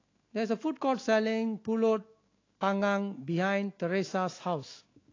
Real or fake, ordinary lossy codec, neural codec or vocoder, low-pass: fake; AAC, 32 kbps; autoencoder, 48 kHz, 128 numbers a frame, DAC-VAE, trained on Japanese speech; 7.2 kHz